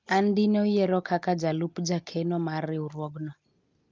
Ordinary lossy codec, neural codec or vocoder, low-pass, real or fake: Opus, 32 kbps; none; 7.2 kHz; real